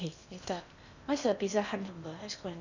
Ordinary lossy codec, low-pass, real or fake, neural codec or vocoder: none; 7.2 kHz; fake; codec, 16 kHz in and 24 kHz out, 0.8 kbps, FocalCodec, streaming, 65536 codes